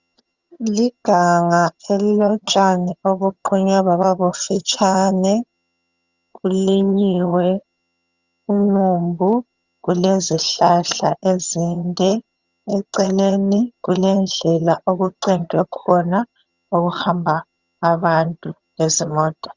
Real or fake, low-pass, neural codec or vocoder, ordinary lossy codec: fake; 7.2 kHz; vocoder, 22.05 kHz, 80 mel bands, HiFi-GAN; Opus, 64 kbps